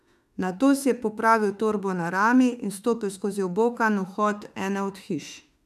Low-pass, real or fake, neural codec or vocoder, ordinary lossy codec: 14.4 kHz; fake; autoencoder, 48 kHz, 32 numbers a frame, DAC-VAE, trained on Japanese speech; none